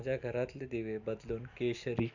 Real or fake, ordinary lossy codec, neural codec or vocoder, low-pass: real; none; none; 7.2 kHz